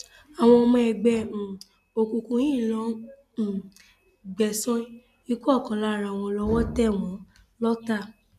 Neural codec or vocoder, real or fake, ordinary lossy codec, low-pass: none; real; none; 14.4 kHz